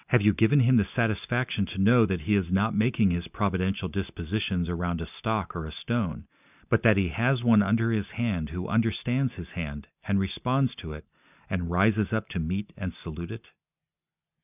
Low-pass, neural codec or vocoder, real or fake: 3.6 kHz; none; real